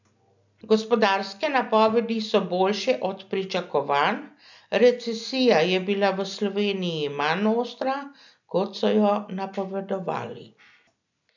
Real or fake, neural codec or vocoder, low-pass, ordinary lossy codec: real; none; 7.2 kHz; none